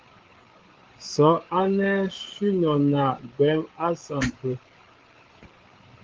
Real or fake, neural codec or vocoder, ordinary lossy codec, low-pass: fake; codec, 16 kHz, 8 kbps, FreqCodec, larger model; Opus, 16 kbps; 7.2 kHz